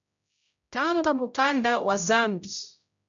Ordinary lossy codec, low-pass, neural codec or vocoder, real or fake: MP3, 64 kbps; 7.2 kHz; codec, 16 kHz, 0.5 kbps, X-Codec, HuBERT features, trained on general audio; fake